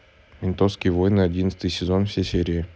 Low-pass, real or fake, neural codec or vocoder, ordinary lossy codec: none; real; none; none